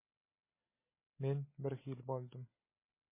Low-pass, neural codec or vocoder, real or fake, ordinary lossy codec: 3.6 kHz; none; real; MP3, 16 kbps